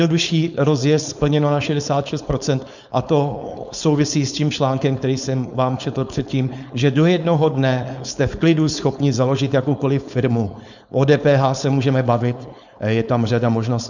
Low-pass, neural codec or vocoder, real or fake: 7.2 kHz; codec, 16 kHz, 4.8 kbps, FACodec; fake